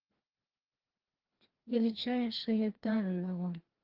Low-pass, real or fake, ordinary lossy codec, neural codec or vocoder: 5.4 kHz; fake; Opus, 16 kbps; codec, 16 kHz, 1 kbps, FreqCodec, larger model